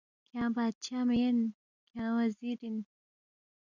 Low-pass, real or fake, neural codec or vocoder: 7.2 kHz; real; none